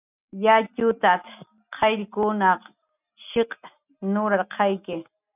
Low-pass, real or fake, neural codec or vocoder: 3.6 kHz; real; none